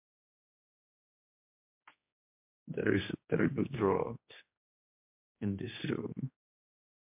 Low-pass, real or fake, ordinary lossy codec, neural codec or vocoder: 3.6 kHz; fake; MP3, 32 kbps; codec, 16 kHz, 2 kbps, X-Codec, HuBERT features, trained on general audio